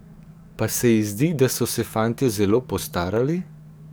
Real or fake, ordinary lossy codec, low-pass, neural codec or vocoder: fake; none; none; codec, 44.1 kHz, 7.8 kbps, DAC